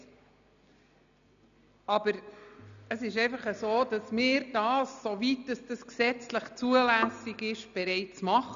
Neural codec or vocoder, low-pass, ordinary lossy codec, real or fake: none; 7.2 kHz; none; real